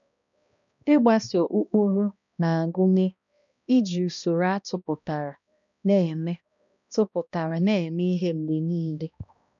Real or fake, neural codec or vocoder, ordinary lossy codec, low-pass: fake; codec, 16 kHz, 1 kbps, X-Codec, HuBERT features, trained on balanced general audio; none; 7.2 kHz